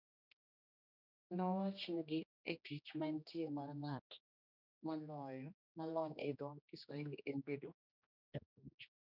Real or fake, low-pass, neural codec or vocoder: fake; 5.4 kHz; codec, 16 kHz, 1 kbps, X-Codec, HuBERT features, trained on general audio